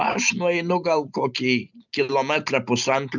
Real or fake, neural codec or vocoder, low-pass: real; none; 7.2 kHz